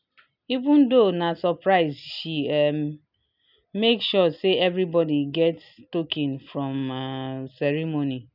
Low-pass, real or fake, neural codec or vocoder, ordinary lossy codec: 5.4 kHz; real; none; none